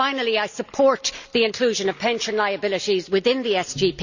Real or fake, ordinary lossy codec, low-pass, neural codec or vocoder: real; none; 7.2 kHz; none